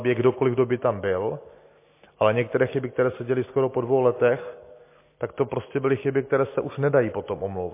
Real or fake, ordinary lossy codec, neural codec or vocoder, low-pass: real; MP3, 24 kbps; none; 3.6 kHz